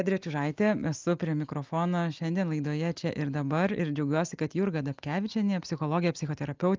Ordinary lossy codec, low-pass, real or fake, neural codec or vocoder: Opus, 24 kbps; 7.2 kHz; real; none